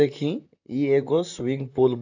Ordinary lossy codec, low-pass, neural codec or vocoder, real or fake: AAC, 48 kbps; 7.2 kHz; vocoder, 44.1 kHz, 128 mel bands, Pupu-Vocoder; fake